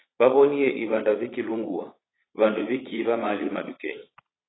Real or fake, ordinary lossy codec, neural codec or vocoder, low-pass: fake; AAC, 16 kbps; vocoder, 22.05 kHz, 80 mel bands, Vocos; 7.2 kHz